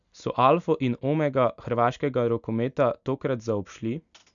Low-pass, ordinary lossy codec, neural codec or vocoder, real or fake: 7.2 kHz; none; none; real